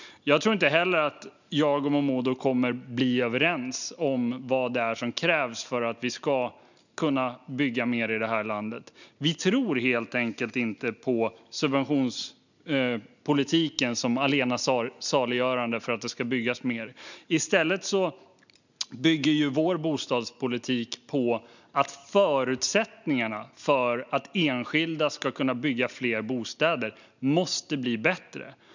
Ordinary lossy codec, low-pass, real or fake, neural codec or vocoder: none; 7.2 kHz; real; none